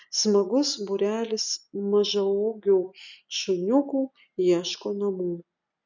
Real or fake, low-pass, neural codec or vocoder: real; 7.2 kHz; none